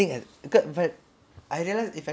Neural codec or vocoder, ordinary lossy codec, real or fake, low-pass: none; none; real; none